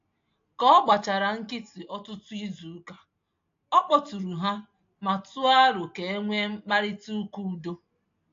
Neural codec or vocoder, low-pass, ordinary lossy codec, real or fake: none; 7.2 kHz; AAC, 48 kbps; real